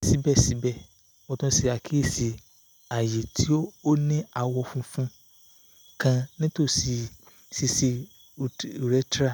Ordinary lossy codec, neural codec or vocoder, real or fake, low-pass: none; none; real; none